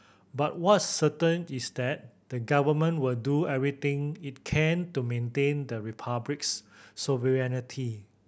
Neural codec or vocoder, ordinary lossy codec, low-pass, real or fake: none; none; none; real